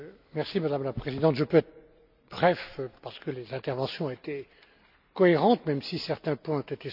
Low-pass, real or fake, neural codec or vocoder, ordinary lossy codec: 5.4 kHz; real; none; AAC, 48 kbps